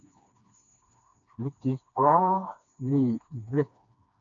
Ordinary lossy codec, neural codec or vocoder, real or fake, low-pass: MP3, 64 kbps; codec, 16 kHz, 2 kbps, FreqCodec, smaller model; fake; 7.2 kHz